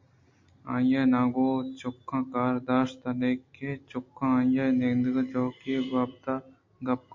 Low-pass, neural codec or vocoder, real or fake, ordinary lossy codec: 7.2 kHz; none; real; MP3, 48 kbps